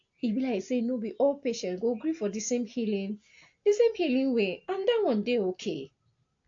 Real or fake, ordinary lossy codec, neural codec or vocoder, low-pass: real; AAC, 48 kbps; none; 7.2 kHz